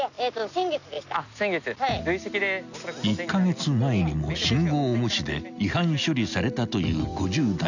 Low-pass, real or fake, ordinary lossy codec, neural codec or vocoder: 7.2 kHz; real; none; none